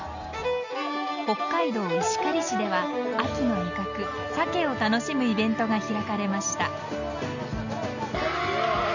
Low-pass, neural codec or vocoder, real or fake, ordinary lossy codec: 7.2 kHz; none; real; none